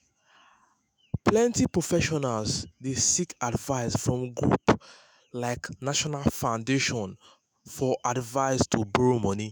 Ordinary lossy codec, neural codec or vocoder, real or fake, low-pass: none; autoencoder, 48 kHz, 128 numbers a frame, DAC-VAE, trained on Japanese speech; fake; none